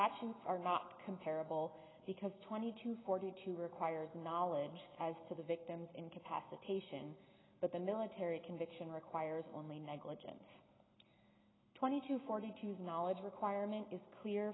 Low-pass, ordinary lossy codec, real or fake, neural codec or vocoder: 7.2 kHz; AAC, 16 kbps; real; none